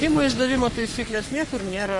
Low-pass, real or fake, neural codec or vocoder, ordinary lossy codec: 10.8 kHz; fake; codec, 44.1 kHz, 3.4 kbps, Pupu-Codec; MP3, 48 kbps